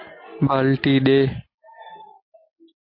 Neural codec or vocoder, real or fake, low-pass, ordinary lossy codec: none; real; 5.4 kHz; AAC, 32 kbps